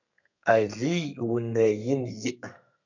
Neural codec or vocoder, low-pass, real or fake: codec, 32 kHz, 1.9 kbps, SNAC; 7.2 kHz; fake